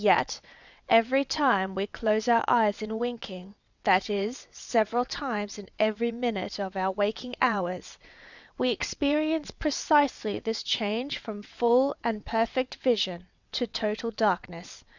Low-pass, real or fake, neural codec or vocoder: 7.2 kHz; fake; vocoder, 22.05 kHz, 80 mel bands, WaveNeXt